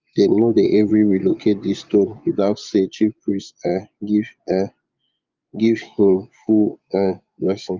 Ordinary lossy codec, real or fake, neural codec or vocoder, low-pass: Opus, 24 kbps; fake; vocoder, 24 kHz, 100 mel bands, Vocos; 7.2 kHz